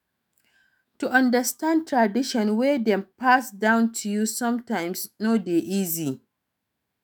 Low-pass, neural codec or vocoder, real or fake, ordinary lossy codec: none; autoencoder, 48 kHz, 128 numbers a frame, DAC-VAE, trained on Japanese speech; fake; none